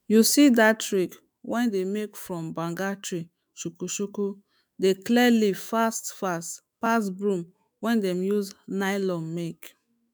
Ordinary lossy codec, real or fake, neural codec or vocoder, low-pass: none; fake; autoencoder, 48 kHz, 128 numbers a frame, DAC-VAE, trained on Japanese speech; none